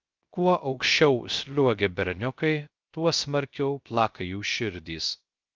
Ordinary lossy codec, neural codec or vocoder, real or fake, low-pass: Opus, 24 kbps; codec, 16 kHz, 0.3 kbps, FocalCodec; fake; 7.2 kHz